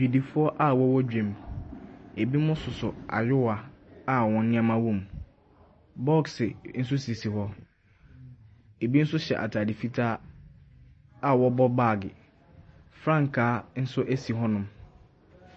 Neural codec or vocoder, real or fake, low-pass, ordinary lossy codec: none; real; 10.8 kHz; MP3, 32 kbps